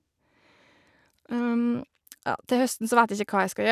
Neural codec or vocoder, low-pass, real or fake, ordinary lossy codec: none; 14.4 kHz; real; none